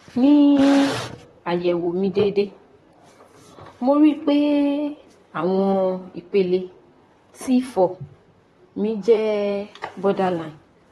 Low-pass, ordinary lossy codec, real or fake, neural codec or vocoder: 19.8 kHz; AAC, 32 kbps; fake; vocoder, 44.1 kHz, 128 mel bands, Pupu-Vocoder